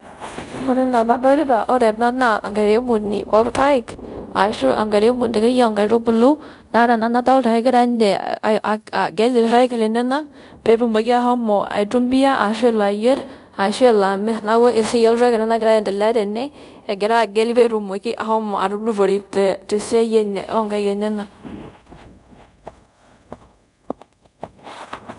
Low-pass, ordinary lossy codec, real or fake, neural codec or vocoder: 10.8 kHz; none; fake; codec, 24 kHz, 0.5 kbps, DualCodec